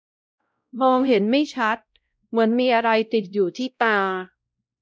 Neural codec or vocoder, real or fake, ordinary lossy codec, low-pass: codec, 16 kHz, 0.5 kbps, X-Codec, WavLM features, trained on Multilingual LibriSpeech; fake; none; none